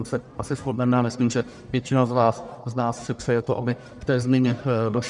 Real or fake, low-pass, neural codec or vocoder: fake; 10.8 kHz; codec, 44.1 kHz, 1.7 kbps, Pupu-Codec